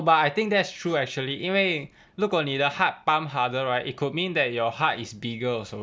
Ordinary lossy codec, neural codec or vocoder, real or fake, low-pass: none; none; real; none